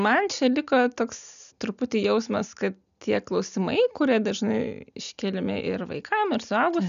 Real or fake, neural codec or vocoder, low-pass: real; none; 7.2 kHz